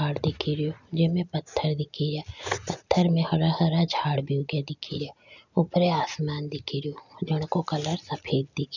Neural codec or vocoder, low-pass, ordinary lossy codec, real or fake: none; 7.2 kHz; none; real